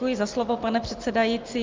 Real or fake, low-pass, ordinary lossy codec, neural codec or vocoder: real; 7.2 kHz; Opus, 32 kbps; none